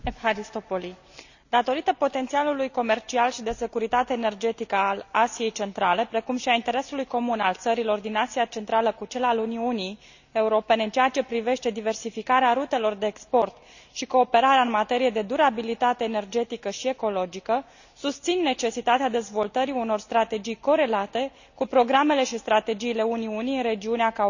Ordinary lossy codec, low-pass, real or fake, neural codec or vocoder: none; 7.2 kHz; real; none